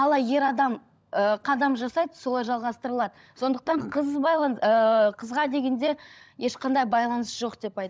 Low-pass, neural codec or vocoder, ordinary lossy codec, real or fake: none; codec, 16 kHz, 16 kbps, FunCodec, trained on LibriTTS, 50 frames a second; none; fake